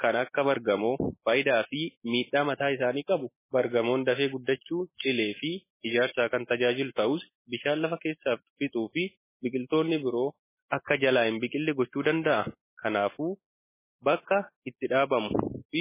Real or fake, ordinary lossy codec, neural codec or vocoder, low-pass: real; MP3, 16 kbps; none; 3.6 kHz